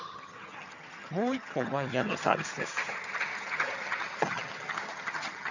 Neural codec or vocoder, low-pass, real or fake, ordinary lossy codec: vocoder, 22.05 kHz, 80 mel bands, HiFi-GAN; 7.2 kHz; fake; none